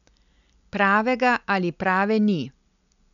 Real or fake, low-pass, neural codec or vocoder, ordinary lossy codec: real; 7.2 kHz; none; none